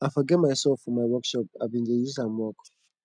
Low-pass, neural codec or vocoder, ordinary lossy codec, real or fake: 9.9 kHz; none; none; real